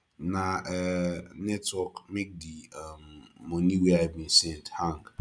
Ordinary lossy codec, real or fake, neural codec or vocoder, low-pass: none; real; none; 9.9 kHz